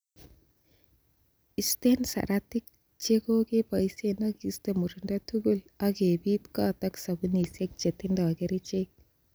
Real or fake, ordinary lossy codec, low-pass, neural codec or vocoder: real; none; none; none